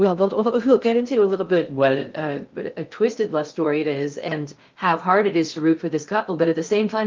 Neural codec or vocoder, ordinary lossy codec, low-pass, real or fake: codec, 16 kHz in and 24 kHz out, 0.6 kbps, FocalCodec, streaming, 2048 codes; Opus, 24 kbps; 7.2 kHz; fake